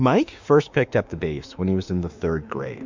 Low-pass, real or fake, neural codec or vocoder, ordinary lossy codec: 7.2 kHz; fake; autoencoder, 48 kHz, 32 numbers a frame, DAC-VAE, trained on Japanese speech; MP3, 64 kbps